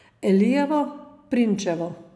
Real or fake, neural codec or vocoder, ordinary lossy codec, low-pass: real; none; none; none